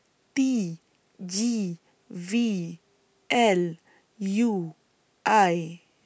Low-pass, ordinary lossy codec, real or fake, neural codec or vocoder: none; none; real; none